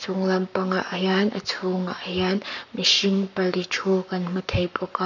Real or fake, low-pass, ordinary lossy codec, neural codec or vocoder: fake; 7.2 kHz; none; codec, 44.1 kHz, 7.8 kbps, Pupu-Codec